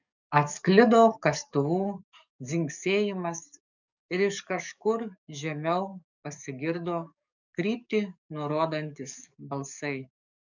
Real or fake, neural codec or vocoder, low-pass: fake; codec, 44.1 kHz, 7.8 kbps, DAC; 7.2 kHz